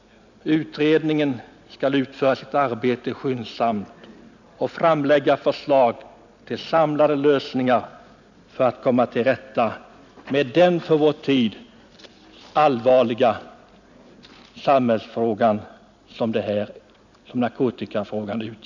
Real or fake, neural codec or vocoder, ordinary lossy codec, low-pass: real; none; none; 7.2 kHz